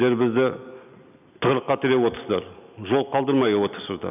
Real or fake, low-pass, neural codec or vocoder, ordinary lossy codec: real; 3.6 kHz; none; none